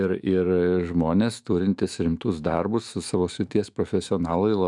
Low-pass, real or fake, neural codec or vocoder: 10.8 kHz; fake; autoencoder, 48 kHz, 128 numbers a frame, DAC-VAE, trained on Japanese speech